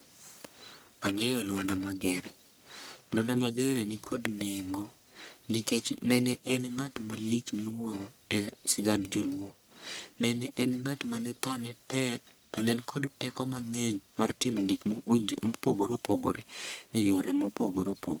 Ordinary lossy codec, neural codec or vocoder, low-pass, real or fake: none; codec, 44.1 kHz, 1.7 kbps, Pupu-Codec; none; fake